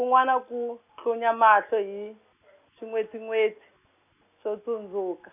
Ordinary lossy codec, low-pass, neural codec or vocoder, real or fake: none; 3.6 kHz; none; real